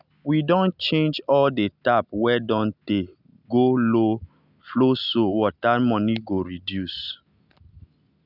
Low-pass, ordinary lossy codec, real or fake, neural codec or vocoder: 5.4 kHz; none; real; none